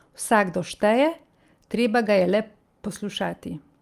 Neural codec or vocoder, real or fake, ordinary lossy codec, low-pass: none; real; Opus, 32 kbps; 14.4 kHz